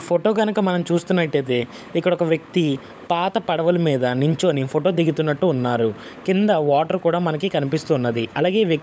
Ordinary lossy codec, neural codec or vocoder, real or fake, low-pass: none; codec, 16 kHz, 16 kbps, FunCodec, trained on LibriTTS, 50 frames a second; fake; none